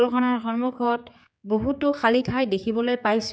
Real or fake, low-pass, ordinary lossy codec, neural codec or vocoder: fake; none; none; codec, 16 kHz, 4 kbps, X-Codec, HuBERT features, trained on general audio